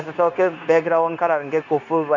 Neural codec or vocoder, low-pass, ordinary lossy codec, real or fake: codec, 16 kHz, 0.9 kbps, LongCat-Audio-Codec; 7.2 kHz; none; fake